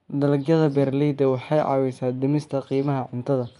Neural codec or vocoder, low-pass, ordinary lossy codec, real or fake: vocoder, 24 kHz, 100 mel bands, Vocos; 10.8 kHz; none; fake